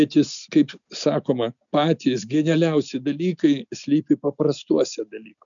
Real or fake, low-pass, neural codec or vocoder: real; 7.2 kHz; none